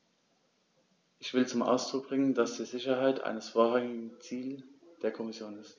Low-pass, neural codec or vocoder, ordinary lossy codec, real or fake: none; none; none; real